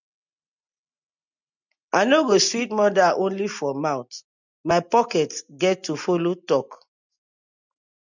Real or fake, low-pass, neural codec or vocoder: real; 7.2 kHz; none